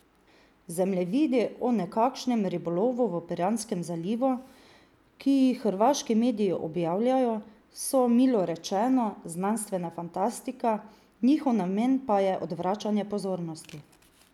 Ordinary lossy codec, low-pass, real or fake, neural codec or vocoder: none; 19.8 kHz; real; none